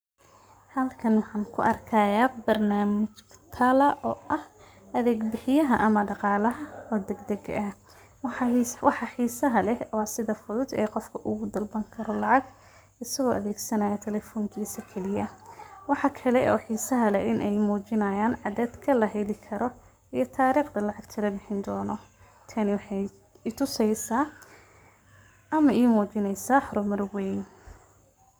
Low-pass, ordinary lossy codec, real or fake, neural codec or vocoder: none; none; fake; codec, 44.1 kHz, 7.8 kbps, Pupu-Codec